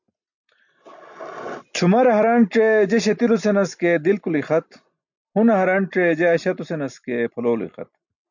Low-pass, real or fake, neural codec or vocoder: 7.2 kHz; real; none